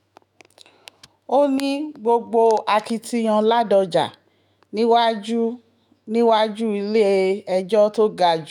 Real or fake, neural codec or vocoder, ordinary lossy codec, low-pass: fake; autoencoder, 48 kHz, 128 numbers a frame, DAC-VAE, trained on Japanese speech; none; 19.8 kHz